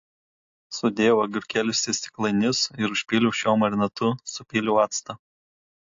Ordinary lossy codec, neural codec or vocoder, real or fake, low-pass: AAC, 48 kbps; none; real; 7.2 kHz